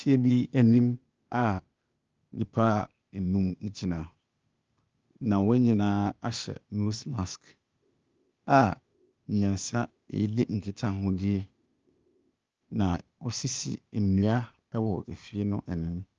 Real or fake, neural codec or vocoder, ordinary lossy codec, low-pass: fake; codec, 16 kHz, 0.8 kbps, ZipCodec; Opus, 24 kbps; 7.2 kHz